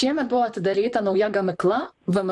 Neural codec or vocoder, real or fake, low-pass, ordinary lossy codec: vocoder, 44.1 kHz, 128 mel bands, Pupu-Vocoder; fake; 10.8 kHz; AAC, 64 kbps